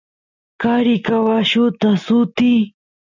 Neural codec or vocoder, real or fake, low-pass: none; real; 7.2 kHz